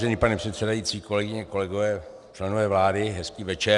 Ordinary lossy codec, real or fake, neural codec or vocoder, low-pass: Opus, 64 kbps; real; none; 10.8 kHz